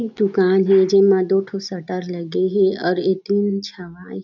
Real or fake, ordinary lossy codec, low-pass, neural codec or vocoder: real; none; 7.2 kHz; none